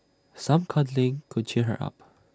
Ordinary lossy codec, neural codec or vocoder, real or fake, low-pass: none; none; real; none